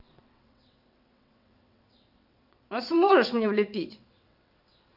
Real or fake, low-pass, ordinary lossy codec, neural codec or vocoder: fake; 5.4 kHz; none; vocoder, 22.05 kHz, 80 mel bands, WaveNeXt